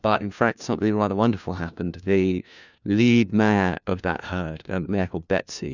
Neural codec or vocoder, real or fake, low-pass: codec, 16 kHz, 1 kbps, FunCodec, trained on LibriTTS, 50 frames a second; fake; 7.2 kHz